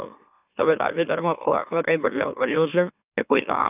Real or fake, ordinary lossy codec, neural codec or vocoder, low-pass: fake; none; autoencoder, 44.1 kHz, a latent of 192 numbers a frame, MeloTTS; 3.6 kHz